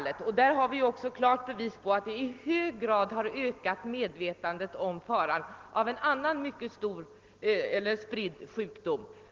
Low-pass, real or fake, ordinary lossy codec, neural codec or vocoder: 7.2 kHz; real; Opus, 16 kbps; none